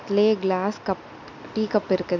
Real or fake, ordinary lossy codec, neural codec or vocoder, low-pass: real; none; none; 7.2 kHz